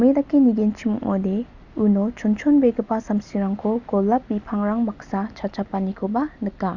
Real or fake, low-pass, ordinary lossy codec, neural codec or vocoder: real; 7.2 kHz; Opus, 64 kbps; none